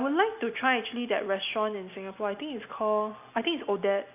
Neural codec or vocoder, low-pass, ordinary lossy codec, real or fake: none; 3.6 kHz; none; real